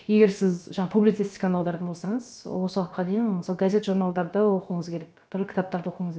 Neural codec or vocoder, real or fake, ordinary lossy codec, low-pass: codec, 16 kHz, about 1 kbps, DyCAST, with the encoder's durations; fake; none; none